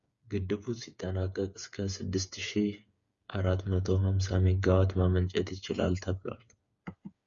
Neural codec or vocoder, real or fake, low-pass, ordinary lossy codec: codec, 16 kHz, 8 kbps, FreqCodec, smaller model; fake; 7.2 kHz; Opus, 64 kbps